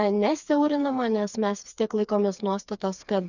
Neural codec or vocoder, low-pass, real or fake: codec, 16 kHz, 4 kbps, FreqCodec, smaller model; 7.2 kHz; fake